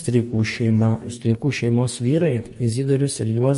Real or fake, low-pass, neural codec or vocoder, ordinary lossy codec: fake; 14.4 kHz; codec, 44.1 kHz, 2.6 kbps, DAC; MP3, 48 kbps